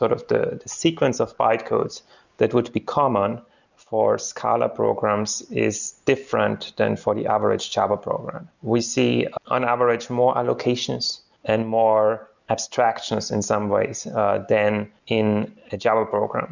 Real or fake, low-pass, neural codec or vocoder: real; 7.2 kHz; none